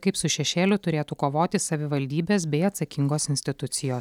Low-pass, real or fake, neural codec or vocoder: 19.8 kHz; real; none